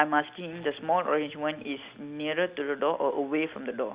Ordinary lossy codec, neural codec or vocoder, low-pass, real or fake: Opus, 64 kbps; none; 3.6 kHz; real